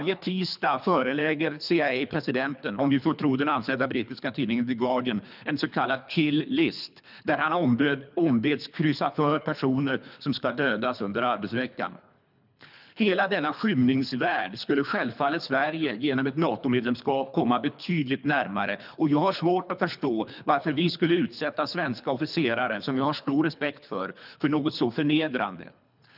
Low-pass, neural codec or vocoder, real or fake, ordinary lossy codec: 5.4 kHz; codec, 24 kHz, 3 kbps, HILCodec; fake; none